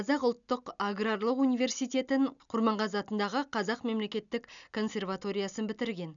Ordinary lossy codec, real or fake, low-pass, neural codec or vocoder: none; real; 7.2 kHz; none